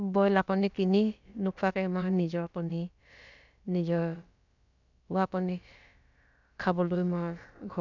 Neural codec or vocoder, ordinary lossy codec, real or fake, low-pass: codec, 16 kHz, about 1 kbps, DyCAST, with the encoder's durations; none; fake; 7.2 kHz